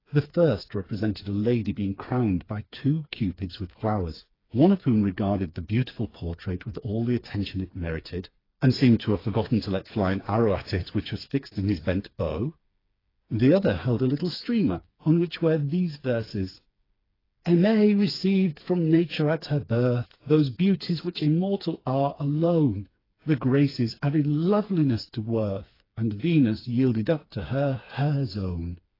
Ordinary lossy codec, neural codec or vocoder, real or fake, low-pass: AAC, 24 kbps; codec, 16 kHz, 4 kbps, FreqCodec, smaller model; fake; 5.4 kHz